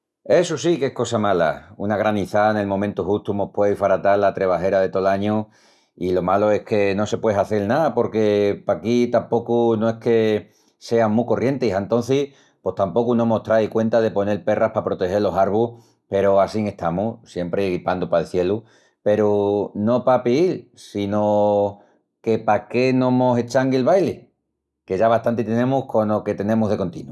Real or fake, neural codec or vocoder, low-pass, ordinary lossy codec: real; none; none; none